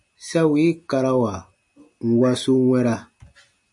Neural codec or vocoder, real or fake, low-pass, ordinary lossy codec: none; real; 10.8 kHz; MP3, 48 kbps